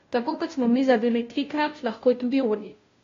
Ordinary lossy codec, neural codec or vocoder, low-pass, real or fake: AAC, 32 kbps; codec, 16 kHz, 0.5 kbps, FunCodec, trained on Chinese and English, 25 frames a second; 7.2 kHz; fake